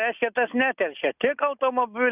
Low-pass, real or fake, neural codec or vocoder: 3.6 kHz; real; none